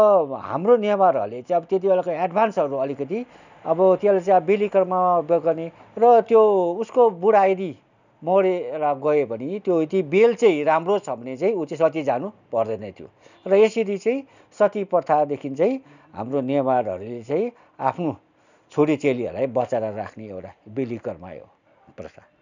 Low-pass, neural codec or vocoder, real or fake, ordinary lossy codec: 7.2 kHz; none; real; none